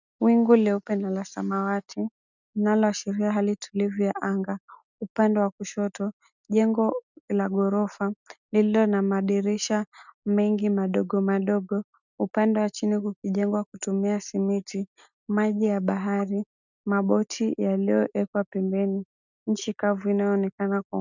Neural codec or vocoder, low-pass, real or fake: none; 7.2 kHz; real